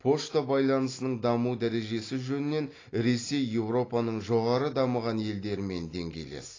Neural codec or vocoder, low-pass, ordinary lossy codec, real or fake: none; 7.2 kHz; AAC, 32 kbps; real